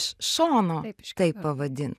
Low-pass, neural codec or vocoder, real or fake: 14.4 kHz; none; real